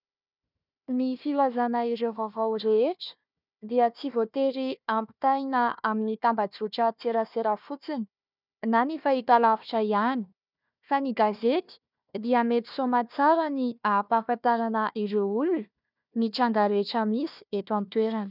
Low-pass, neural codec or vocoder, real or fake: 5.4 kHz; codec, 16 kHz, 1 kbps, FunCodec, trained on Chinese and English, 50 frames a second; fake